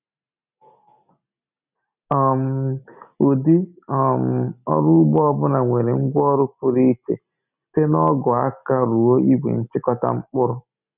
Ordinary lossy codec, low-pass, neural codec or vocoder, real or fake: none; 3.6 kHz; none; real